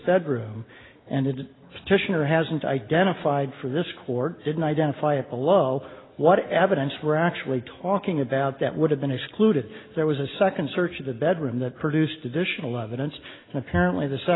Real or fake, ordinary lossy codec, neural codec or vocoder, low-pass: real; AAC, 16 kbps; none; 7.2 kHz